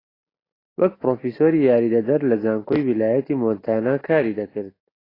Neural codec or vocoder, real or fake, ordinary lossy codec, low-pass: none; real; AAC, 24 kbps; 5.4 kHz